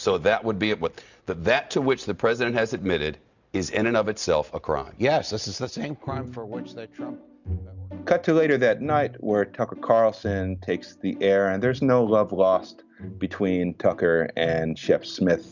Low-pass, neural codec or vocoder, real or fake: 7.2 kHz; none; real